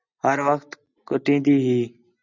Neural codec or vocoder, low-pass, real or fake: none; 7.2 kHz; real